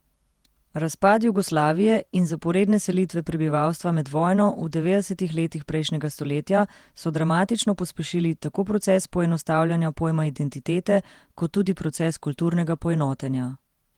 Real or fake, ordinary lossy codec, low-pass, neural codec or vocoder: fake; Opus, 24 kbps; 19.8 kHz; vocoder, 48 kHz, 128 mel bands, Vocos